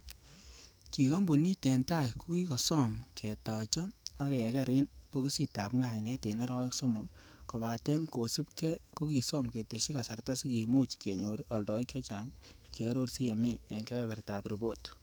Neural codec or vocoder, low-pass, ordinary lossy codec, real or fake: codec, 44.1 kHz, 2.6 kbps, SNAC; none; none; fake